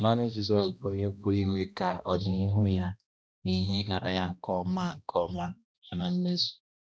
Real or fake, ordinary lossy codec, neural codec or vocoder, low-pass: fake; none; codec, 16 kHz, 1 kbps, X-Codec, HuBERT features, trained on balanced general audio; none